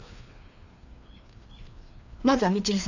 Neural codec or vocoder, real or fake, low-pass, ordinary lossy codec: codec, 16 kHz, 2 kbps, FreqCodec, larger model; fake; 7.2 kHz; none